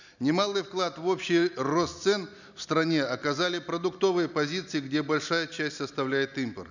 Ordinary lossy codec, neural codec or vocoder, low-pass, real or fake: none; none; 7.2 kHz; real